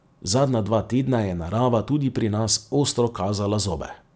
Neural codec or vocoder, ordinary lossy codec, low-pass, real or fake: none; none; none; real